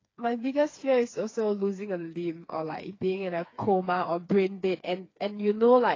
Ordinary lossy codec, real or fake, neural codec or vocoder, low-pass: AAC, 32 kbps; fake; codec, 16 kHz, 4 kbps, FreqCodec, smaller model; 7.2 kHz